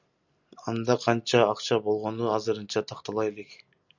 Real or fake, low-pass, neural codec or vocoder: real; 7.2 kHz; none